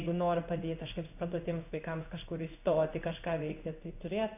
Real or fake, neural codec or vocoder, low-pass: fake; codec, 16 kHz in and 24 kHz out, 1 kbps, XY-Tokenizer; 3.6 kHz